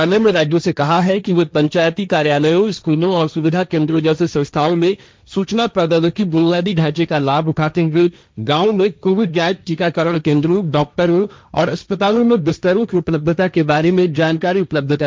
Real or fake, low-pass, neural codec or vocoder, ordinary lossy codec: fake; none; codec, 16 kHz, 1.1 kbps, Voila-Tokenizer; none